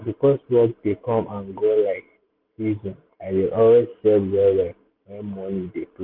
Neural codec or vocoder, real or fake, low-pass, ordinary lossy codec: none; real; 5.4 kHz; MP3, 48 kbps